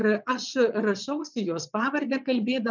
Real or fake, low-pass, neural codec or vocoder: fake; 7.2 kHz; vocoder, 22.05 kHz, 80 mel bands, WaveNeXt